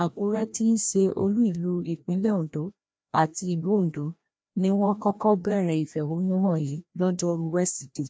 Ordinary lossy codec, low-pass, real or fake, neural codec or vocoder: none; none; fake; codec, 16 kHz, 1 kbps, FreqCodec, larger model